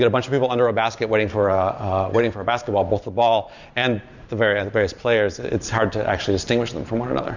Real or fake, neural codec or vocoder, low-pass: fake; vocoder, 44.1 kHz, 128 mel bands every 512 samples, BigVGAN v2; 7.2 kHz